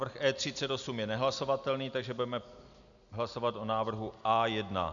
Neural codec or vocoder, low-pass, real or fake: none; 7.2 kHz; real